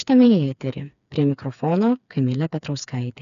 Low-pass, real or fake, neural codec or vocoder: 7.2 kHz; fake; codec, 16 kHz, 4 kbps, FreqCodec, smaller model